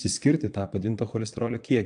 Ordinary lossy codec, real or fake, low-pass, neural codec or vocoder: AAC, 64 kbps; fake; 9.9 kHz; vocoder, 22.05 kHz, 80 mel bands, Vocos